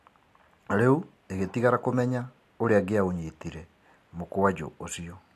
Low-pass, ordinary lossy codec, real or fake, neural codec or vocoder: 14.4 kHz; AAC, 64 kbps; real; none